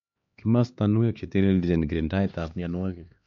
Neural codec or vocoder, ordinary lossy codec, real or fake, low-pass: codec, 16 kHz, 4 kbps, X-Codec, HuBERT features, trained on LibriSpeech; MP3, 48 kbps; fake; 7.2 kHz